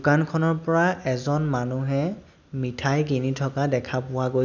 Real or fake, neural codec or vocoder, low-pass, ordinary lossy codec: real; none; 7.2 kHz; none